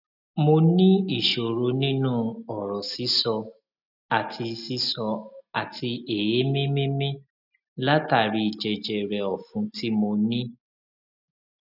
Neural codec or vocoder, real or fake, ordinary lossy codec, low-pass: none; real; none; 5.4 kHz